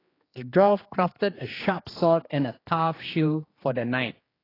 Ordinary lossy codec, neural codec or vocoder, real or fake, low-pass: AAC, 24 kbps; codec, 16 kHz, 2 kbps, X-Codec, HuBERT features, trained on general audio; fake; 5.4 kHz